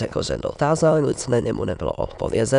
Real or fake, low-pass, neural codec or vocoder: fake; 9.9 kHz; autoencoder, 22.05 kHz, a latent of 192 numbers a frame, VITS, trained on many speakers